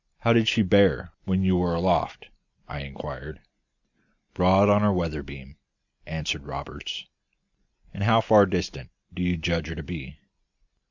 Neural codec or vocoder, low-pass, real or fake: none; 7.2 kHz; real